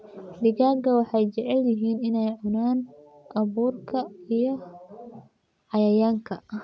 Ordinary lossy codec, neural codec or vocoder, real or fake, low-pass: none; none; real; none